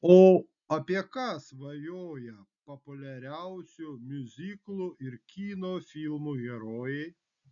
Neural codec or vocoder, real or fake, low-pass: none; real; 7.2 kHz